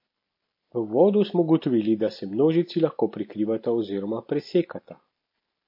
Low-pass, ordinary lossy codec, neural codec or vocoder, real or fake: 5.4 kHz; MP3, 32 kbps; none; real